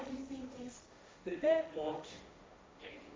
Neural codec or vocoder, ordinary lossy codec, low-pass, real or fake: codec, 16 kHz, 1.1 kbps, Voila-Tokenizer; none; none; fake